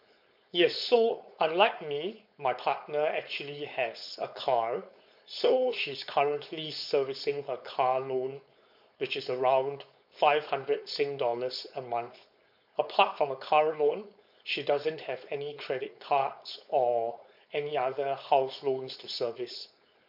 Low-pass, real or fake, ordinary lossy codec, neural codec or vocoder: 5.4 kHz; fake; MP3, 48 kbps; codec, 16 kHz, 4.8 kbps, FACodec